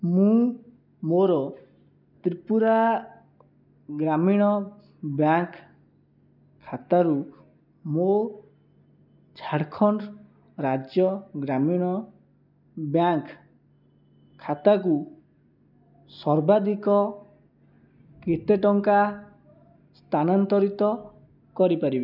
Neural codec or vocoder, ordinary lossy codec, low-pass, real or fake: none; none; 5.4 kHz; real